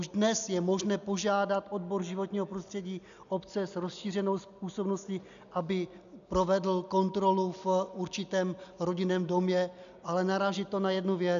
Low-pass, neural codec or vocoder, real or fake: 7.2 kHz; none; real